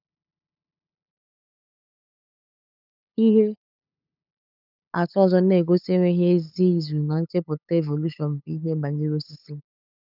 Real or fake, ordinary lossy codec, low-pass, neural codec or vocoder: fake; none; 5.4 kHz; codec, 16 kHz, 8 kbps, FunCodec, trained on LibriTTS, 25 frames a second